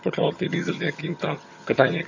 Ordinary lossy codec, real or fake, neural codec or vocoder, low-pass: AAC, 48 kbps; fake; vocoder, 22.05 kHz, 80 mel bands, HiFi-GAN; 7.2 kHz